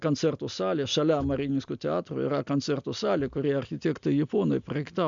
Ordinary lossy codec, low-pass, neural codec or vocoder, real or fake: MP3, 64 kbps; 7.2 kHz; none; real